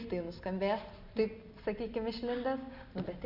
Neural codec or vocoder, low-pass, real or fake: none; 5.4 kHz; real